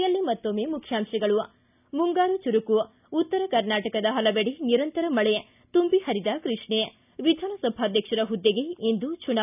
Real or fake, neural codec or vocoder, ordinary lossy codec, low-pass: real; none; none; 3.6 kHz